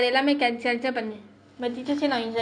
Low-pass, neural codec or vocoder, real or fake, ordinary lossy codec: 9.9 kHz; none; real; none